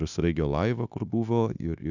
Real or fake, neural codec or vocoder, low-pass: fake; codec, 16 kHz, 0.9 kbps, LongCat-Audio-Codec; 7.2 kHz